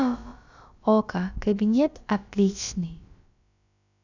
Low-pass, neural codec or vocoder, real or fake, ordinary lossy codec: 7.2 kHz; codec, 16 kHz, about 1 kbps, DyCAST, with the encoder's durations; fake; none